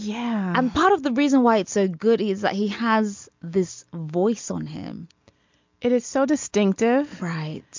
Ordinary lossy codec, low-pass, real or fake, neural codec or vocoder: MP3, 64 kbps; 7.2 kHz; real; none